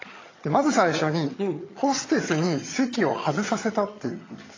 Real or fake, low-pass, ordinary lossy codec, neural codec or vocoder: fake; 7.2 kHz; AAC, 32 kbps; vocoder, 22.05 kHz, 80 mel bands, HiFi-GAN